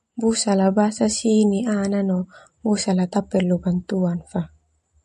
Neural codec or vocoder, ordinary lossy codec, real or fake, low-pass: none; MP3, 48 kbps; real; 14.4 kHz